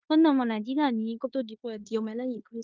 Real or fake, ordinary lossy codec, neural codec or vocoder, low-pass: fake; Opus, 24 kbps; codec, 16 kHz in and 24 kHz out, 0.9 kbps, LongCat-Audio-Codec, fine tuned four codebook decoder; 7.2 kHz